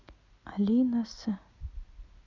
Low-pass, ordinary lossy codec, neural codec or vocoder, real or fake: 7.2 kHz; none; none; real